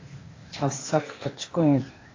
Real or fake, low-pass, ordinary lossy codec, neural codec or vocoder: fake; 7.2 kHz; AAC, 32 kbps; codec, 16 kHz, 0.8 kbps, ZipCodec